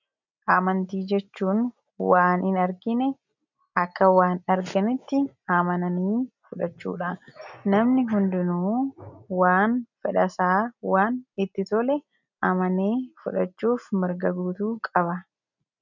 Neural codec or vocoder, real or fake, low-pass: none; real; 7.2 kHz